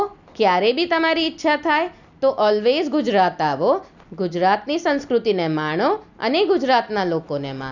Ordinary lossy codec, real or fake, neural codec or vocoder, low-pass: none; real; none; 7.2 kHz